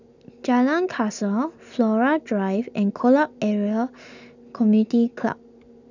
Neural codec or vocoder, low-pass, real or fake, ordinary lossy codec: none; 7.2 kHz; real; none